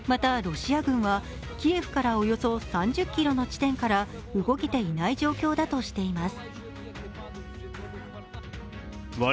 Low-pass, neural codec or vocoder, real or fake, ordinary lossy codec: none; none; real; none